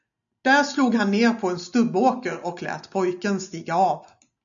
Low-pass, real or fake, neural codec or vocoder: 7.2 kHz; real; none